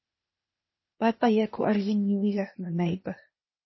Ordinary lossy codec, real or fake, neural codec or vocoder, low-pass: MP3, 24 kbps; fake; codec, 16 kHz, 0.8 kbps, ZipCodec; 7.2 kHz